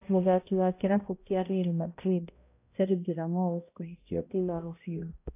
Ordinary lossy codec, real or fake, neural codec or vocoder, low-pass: AAC, 24 kbps; fake; codec, 16 kHz, 0.5 kbps, X-Codec, HuBERT features, trained on balanced general audio; 3.6 kHz